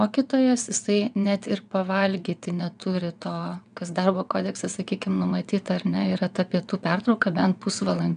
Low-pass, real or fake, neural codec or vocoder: 9.9 kHz; fake; vocoder, 22.05 kHz, 80 mel bands, WaveNeXt